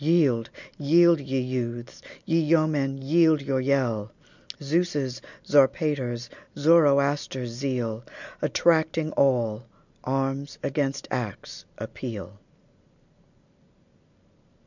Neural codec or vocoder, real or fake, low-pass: none; real; 7.2 kHz